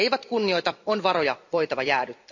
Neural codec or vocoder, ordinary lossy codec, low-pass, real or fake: vocoder, 44.1 kHz, 128 mel bands every 256 samples, BigVGAN v2; none; 7.2 kHz; fake